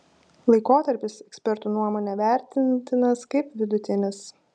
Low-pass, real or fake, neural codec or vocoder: 9.9 kHz; real; none